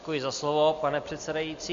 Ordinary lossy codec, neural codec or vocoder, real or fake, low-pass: MP3, 48 kbps; none; real; 7.2 kHz